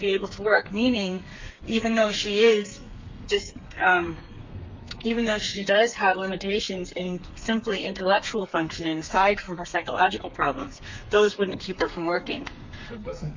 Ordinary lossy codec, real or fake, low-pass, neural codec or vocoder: MP3, 48 kbps; fake; 7.2 kHz; codec, 32 kHz, 1.9 kbps, SNAC